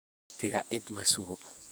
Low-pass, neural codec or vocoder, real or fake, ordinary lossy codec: none; codec, 44.1 kHz, 2.6 kbps, SNAC; fake; none